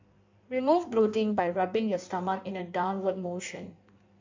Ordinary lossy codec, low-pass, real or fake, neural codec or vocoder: MP3, 64 kbps; 7.2 kHz; fake; codec, 16 kHz in and 24 kHz out, 1.1 kbps, FireRedTTS-2 codec